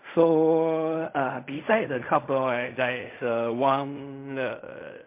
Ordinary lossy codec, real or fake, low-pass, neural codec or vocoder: MP3, 32 kbps; fake; 3.6 kHz; codec, 16 kHz in and 24 kHz out, 0.4 kbps, LongCat-Audio-Codec, fine tuned four codebook decoder